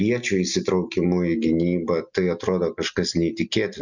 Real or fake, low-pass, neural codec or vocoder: real; 7.2 kHz; none